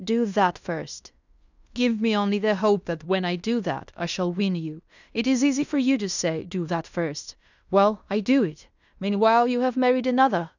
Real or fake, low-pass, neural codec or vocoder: fake; 7.2 kHz; codec, 16 kHz in and 24 kHz out, 0.9 kbps, LongCat-Audio-Codec, fine tuned four codebook decoder